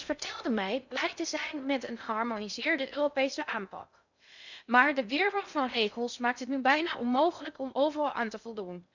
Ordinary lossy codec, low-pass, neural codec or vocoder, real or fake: none; 7.2 kHz; codec, 16 kHz in and 24 kHz out, 0.6 kbps, FocalCodec, streaming, 2048 codes; fake